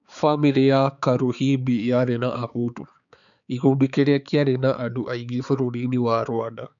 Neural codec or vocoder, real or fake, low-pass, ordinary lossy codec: codec, 16 kHz, 4 kbps, X-Codec, HuBERT features, trained on balanced general audio; fake; 7.2 kHz; none